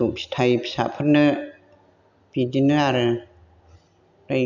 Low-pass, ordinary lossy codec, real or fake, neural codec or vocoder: 7.2 kHz; none; real; none